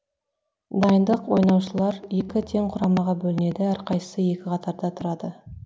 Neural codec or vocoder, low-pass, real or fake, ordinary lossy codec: none; none; real; none